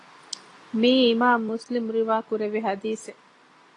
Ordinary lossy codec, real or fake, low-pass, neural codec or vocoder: AAC, 32 kbps; real; 10.8 kHz; none